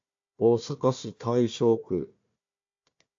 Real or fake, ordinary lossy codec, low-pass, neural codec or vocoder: fake; AAC, 48 kbps; 7.2 kHz; codec, 16 kHz, 1 kbps, FunCodec, trained on Chinese and English, 50 frames a second